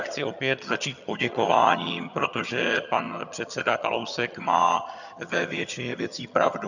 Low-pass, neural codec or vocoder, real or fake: 7.2 kHz; vocoder, 22.05 kHz, 80 mel bands, HiFi-GAN; fake